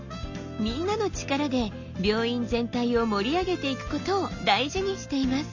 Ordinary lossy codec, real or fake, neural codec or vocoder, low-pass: none; real; none; 7.2 kHz